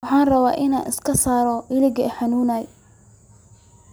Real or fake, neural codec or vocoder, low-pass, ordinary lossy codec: real; none; none; none